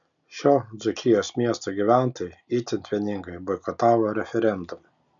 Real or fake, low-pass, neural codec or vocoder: real; 7.2 kHz; none